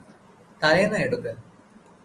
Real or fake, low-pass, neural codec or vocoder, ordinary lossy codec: real; 10.8 kHz; none; Opus, 24 kbps